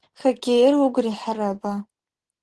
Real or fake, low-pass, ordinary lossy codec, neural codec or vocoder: real; 10.8 kHz; Opus, 16 kbps; none